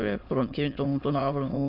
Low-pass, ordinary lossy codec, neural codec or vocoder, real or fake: 5.4 kHz; Opus, 64 kbps; autoencoder, 22.05 kHz, a latent of 192 numbers a frame, VITS, trained on many speakers; fake